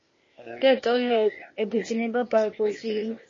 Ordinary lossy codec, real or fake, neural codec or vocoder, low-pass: MP3, 32 kbps; fake; codec, 16 kHz, 0.8 kbps, ZipCodec; 7.2 kHz